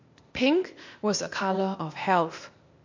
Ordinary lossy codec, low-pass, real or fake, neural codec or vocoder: MP3, 48 kbps; 7.2 kHz; fake; codec, 16 kHz, 0.8 kbps, ZipCodec